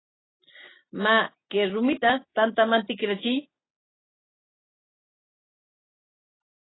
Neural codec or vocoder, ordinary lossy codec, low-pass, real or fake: none; AAC, 16 kbps; 7.2 kHz; real